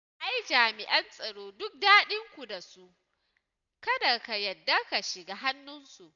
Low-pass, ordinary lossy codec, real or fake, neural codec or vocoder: 7.2 kHz; none; real; none